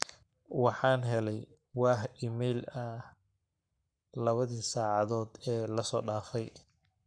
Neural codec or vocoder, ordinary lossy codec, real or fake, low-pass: codec, 44.1 kHz, 7.8 kbps, Pupu-Codec; none; fake; 9.9 kHz